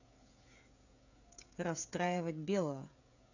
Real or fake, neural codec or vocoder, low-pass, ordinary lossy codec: fake; codec, 16 kHz, 16 kbps, FreqCodec, smaller model; 7.2 kHz; none